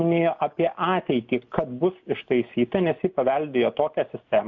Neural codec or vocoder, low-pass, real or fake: none; 7.2 kHz; real